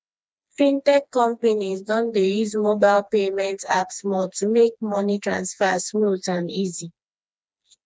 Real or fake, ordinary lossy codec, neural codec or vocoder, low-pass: fake; none; codec, 16 kHz, 2 kbps, FreqCodec, smaller model; none